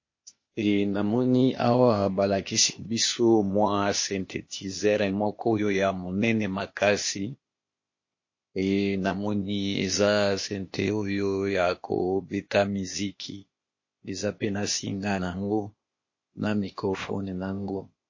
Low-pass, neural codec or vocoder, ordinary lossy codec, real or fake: 7.2 kHz; codec, 16 kHz, 0.8 kbps, ZipCodec; MP3, 32 kbps; fake